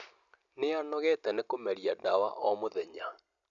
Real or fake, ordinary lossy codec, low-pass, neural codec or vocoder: real; none; 7.2 kHz; none